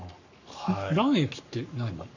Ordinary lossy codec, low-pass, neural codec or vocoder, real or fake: none; 7.2 kHz; codec, 44.1 kHz, 7.8 kbps, Pupu-Codec; fake